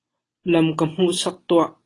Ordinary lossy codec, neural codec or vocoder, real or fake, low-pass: AAC, 32 kbps; none; real; 10.8 kHz